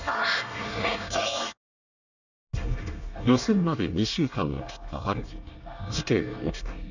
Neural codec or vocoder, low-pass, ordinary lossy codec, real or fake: codec, 24 kHz, 1 kbps, SNAC; 7.2 kHz; none; fake